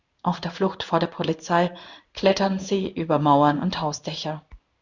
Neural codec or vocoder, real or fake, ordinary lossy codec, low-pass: codec, 16 kHz in and 24 kHz out, 1 kbps, XY-Tokenizer; fake; Opus, 64 kbps; 7.2 kHz